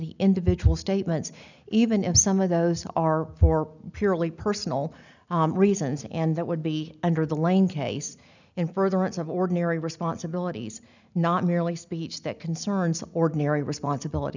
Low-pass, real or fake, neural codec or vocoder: 7.2 kHz; real; none